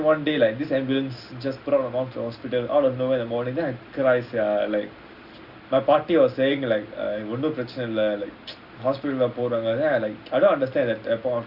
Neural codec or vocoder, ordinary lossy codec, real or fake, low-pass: none; Opus, 64 kbps; real; 5.4 kHz